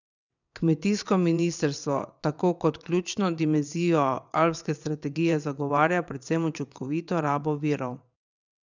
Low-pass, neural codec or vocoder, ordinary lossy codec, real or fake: 7.2 kHz; vocoder, 22.05 kHz, 80 mel bands, WaveNeXt; none; fake